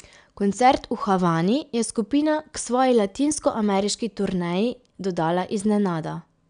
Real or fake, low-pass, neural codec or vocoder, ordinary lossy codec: real; 9.9 kHz; none; none